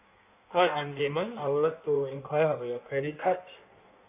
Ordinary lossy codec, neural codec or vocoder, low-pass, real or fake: none; codec, 16 kHz in and 24 kHz out, 1.1 kbps, FireRedTTS-2 codec; 3.6 kHz; fake